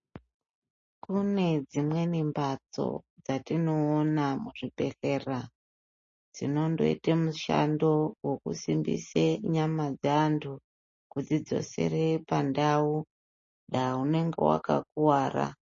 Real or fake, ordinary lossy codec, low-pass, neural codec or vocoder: real; MP3, 32 kbps; 7.2 kHz; none